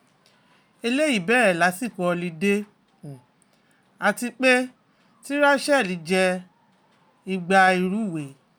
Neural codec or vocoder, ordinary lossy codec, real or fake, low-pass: none; none; real; none